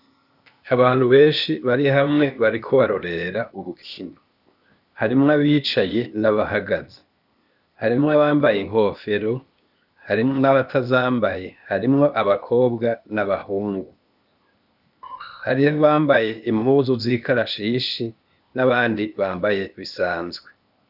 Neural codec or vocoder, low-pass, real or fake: codec, 16 kHz, 0.8 kbps, ZipCodec; 5.4 kHz; fake